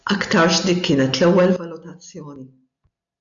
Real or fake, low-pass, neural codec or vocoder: real; 7.2 kHz; none